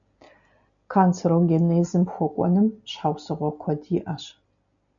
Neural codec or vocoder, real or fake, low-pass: none; real; 7.2 kHz